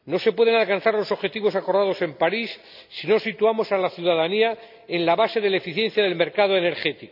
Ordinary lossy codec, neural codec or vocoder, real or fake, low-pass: none; none; real; 5.4 kHz